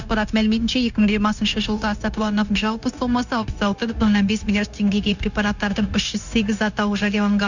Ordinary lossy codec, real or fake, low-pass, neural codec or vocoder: none; fake; 7.2 kHz; codec, 16 kHz, 0.9 kbps, LongCat-Audio-Codec